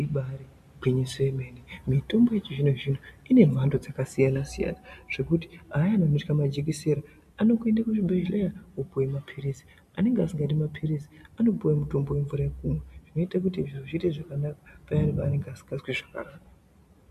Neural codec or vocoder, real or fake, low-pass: none; real; 14.4 kHz